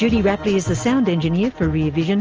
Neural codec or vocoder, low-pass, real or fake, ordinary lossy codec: none; 7.2 kHz; real; Opus, 24 kbps